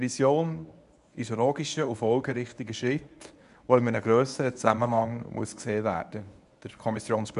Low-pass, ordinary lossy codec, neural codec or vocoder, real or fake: 10.8 kHz; none; codec, 24 kHz, 0.9 kbps, WavTokenizer, medium speech release version 1; fake